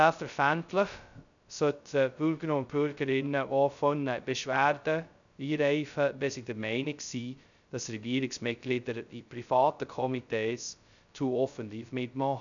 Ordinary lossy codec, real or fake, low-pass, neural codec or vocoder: none; fake; 7.2 kHz; codec, 16 kHz, 0.2 kbps, FocalCodec